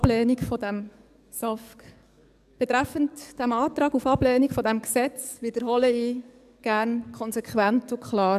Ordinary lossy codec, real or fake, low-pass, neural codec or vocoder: none; fake; 14.4 kHz; codec, 44.1 kHz, 7.8 kbps, DAC